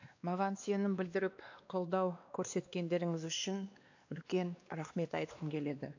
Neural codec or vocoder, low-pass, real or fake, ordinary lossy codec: codec, 16 kHz, 2 kbps, X-Codec, WavLM features, trained on Multilingual LibriSpeech; 7.2 kHz; fake; AAC, 48 kbps